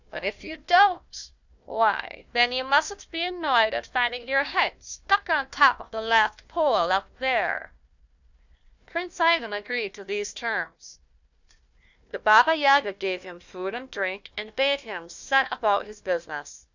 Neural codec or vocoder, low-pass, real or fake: codec, 16 kHz, 1 kbps, FunCodec, trained on Chinese and English, 50 frames a second; 7.2 kHz; fake